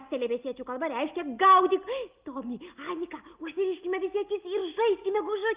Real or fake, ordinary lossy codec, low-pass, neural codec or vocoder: real; Opus, 24 kbps; 3.6 kHz; none